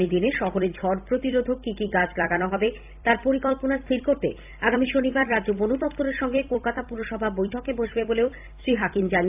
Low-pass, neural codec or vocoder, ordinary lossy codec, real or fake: 3.6 kHz; vocoder, 44.1 kHz, 128 mel bands every 256 samples, BigVGAN v2; AAC, 32 kbps; fake